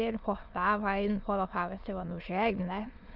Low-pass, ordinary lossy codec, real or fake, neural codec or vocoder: 5.4 kHz; Opus, 24 kbps; fake; autoencoder, 22.05 kHz, a latent of 192 numbers a frame, VITS, trained on many speakers